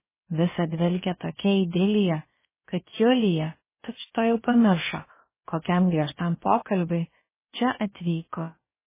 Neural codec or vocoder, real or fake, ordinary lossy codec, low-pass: codec, 16 kHz, about 1 kbps, DyCAST, with the encoder's durations; fake; MP3, 16 kbps; 3.6 kHz